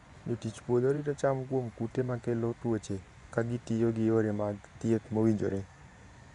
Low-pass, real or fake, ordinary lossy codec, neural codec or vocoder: 10.8 kHz; fake; none; vocoder, 24 kHz, 100 mel bands, Vocos